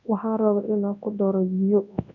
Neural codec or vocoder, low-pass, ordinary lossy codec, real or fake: codec, 24 kHz, 0.9 kbps, WavTokenizer, large speech release; 7.2 kHz; none; fake